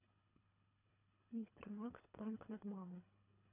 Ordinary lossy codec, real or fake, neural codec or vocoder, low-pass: none; fake; codec, 24 kHz, 3 kbps, HILCodec; 3.6 kHz